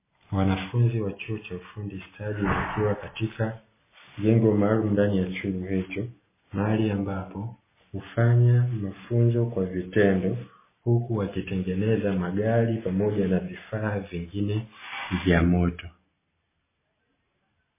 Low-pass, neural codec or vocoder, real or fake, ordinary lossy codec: 3.6 kHz; none; real; MP3, 16 kbps